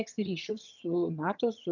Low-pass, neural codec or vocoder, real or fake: 7.2 kHz; vocoder, 22.05 kHz, 80 mel bands, HiFi-GAN; fake